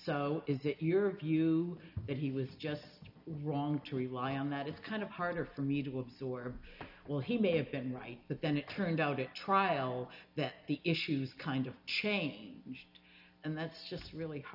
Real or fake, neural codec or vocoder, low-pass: real; none; 5.4 kHz